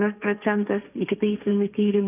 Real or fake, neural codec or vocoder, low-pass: fake; codec, 16 kHz, 1.1 kbps, Voila-Tokenizer; 3.6 kHz